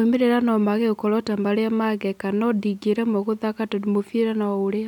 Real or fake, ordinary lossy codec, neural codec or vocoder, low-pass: real; none; none; 19.8 kHz